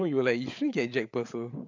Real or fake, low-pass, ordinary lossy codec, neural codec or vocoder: fake; 7.2 kHz; MP3, 64 kbps; codec, 16 kHz, 8 kbps, FreqCodec, larger model